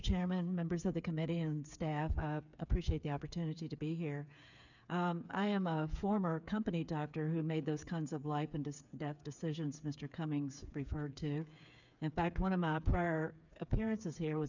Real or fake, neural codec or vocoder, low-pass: fake; codec, 16 kHz, 8 kbps, FreqCodec, smaller model; 7.2 kHz